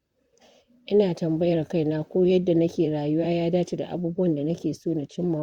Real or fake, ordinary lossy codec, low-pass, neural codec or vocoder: fake; Opus, 64 kbps; 19.8 kHz; vocoder, 44.1 kHz, 128 mel bands, Pupu-Vocoder